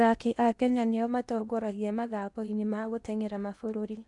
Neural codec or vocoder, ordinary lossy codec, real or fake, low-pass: codec, 16 kHz in and 24 kHz out, 0.8 kbps, FocalCodec, streaming, 65536 codes; none; fake; 10.8 kHz